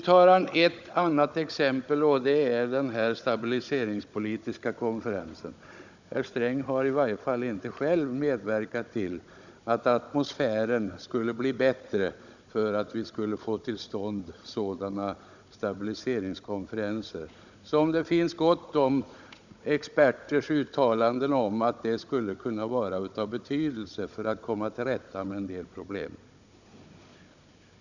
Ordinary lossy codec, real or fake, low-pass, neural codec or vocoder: none; fake; 7.2 kHz; codec, 16 kHz, 16 kbps, FunCodec, trained on Chinese and English, 50 frames a second